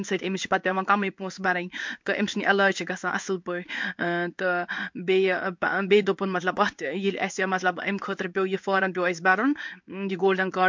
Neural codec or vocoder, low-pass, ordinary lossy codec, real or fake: codec, 16 kHz in and 24 kHz out, 1 kbps, XY-Tokenizer; 7.2 kHz; MP3, 64 kbps; fake